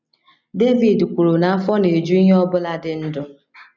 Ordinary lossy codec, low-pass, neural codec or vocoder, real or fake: none; 7.2 kHz; none; real